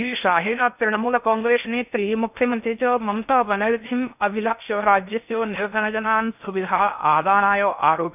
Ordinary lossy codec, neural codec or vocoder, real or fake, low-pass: none; codec, 16 kHz in and 24 kHz out, 0.6 kbps, FocalCodec, streaming, 2048 codes; fake; 3.6 kHz